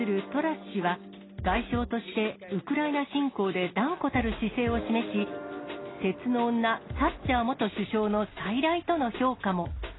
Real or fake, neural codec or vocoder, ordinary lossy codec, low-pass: real; none; AAC, 16 kbps; 7.2 kHz